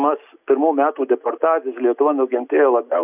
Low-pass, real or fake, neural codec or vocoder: 3.6 kHz; real; none